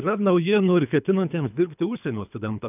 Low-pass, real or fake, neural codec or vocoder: 3.6 kHz; fake; codec, 24 kHz, 3 kbps, HILCodec